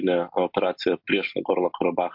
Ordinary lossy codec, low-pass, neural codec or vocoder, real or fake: MP3, 48 kbps; 5.4 kHz; none; real